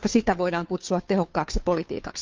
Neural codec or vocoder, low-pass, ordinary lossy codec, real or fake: codec, 16 kHz, 2 kbps, FunCodec, trained on Chinese and English, 25 frames a second; 7.2 kHz; Opus, 24 kbps; fake